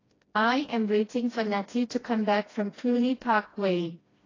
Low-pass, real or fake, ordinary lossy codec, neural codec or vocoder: 7.2 kHz; fake; AAC, 32 kbps; codec, 16 kHz, 1 kbps, FreqCodec, smaller model